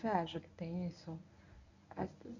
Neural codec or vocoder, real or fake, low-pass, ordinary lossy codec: codec, 24 kHz, 0.9 kbps, WavTokenizer, medium speech release version 1; fake; 7.2 kHz; none